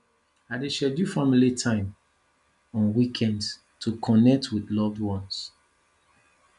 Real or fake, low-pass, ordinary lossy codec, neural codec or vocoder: real; 10.8 kHz; none; none